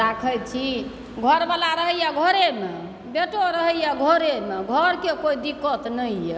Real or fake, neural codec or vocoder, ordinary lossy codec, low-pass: real; none; none; none